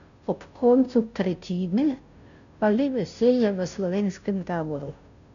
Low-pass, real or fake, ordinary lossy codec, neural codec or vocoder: 7.2 kHz; fake; none; codec, 16 kHz, 0.5 kbps, FunCodec, trained on Chinese and English, 25 frames a second